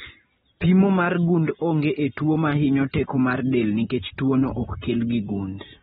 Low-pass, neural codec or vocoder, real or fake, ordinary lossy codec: 7.2 kHz; none; real; AAC, 16 kbps